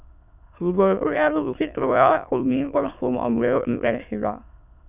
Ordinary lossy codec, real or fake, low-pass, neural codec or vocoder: none; fake; 3.6 kHz; autoencoder, 22.05 kHz, a latent of 192 numbers a frame, VITS, trained on many speakers